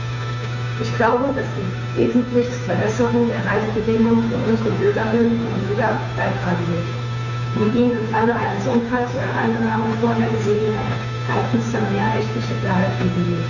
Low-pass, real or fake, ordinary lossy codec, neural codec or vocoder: 7.2 kHz; fake; none; codec, 16 kHz, 2 kbps, FunCodec, trained on Chinese and English, 25 frames a second